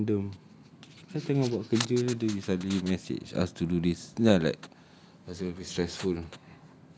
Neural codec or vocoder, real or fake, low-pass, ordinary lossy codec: none; real; none; none